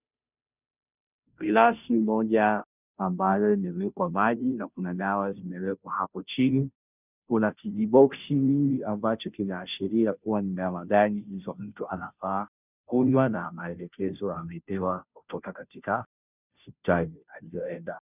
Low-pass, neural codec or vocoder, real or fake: 3.6 kHz; codec, 16 kHz, 0.5 kbps, FunCodec, trained on Chinese and English, 25 frames a second; fake